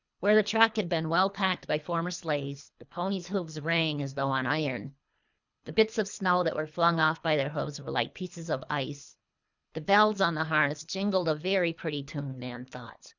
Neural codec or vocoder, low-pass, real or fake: codec, 24 kHz, 3 kbps, HILCodec; 7.2 kHz; fake